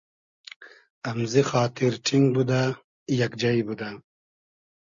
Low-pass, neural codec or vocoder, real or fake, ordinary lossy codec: 7.2 kHz; none; real; Opus, 64 kbps